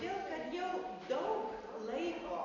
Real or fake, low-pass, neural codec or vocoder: real; 7.2 kHz; none